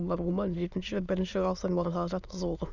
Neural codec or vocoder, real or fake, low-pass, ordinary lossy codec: autoencoder, 22.05 kHz, a latent of 192 numbers a frame, VITS, trained on many speakers; fake; 7.2 kHz; none